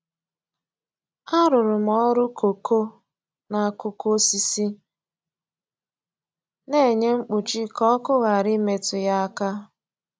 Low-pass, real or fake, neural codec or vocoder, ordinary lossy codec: none; real; none; none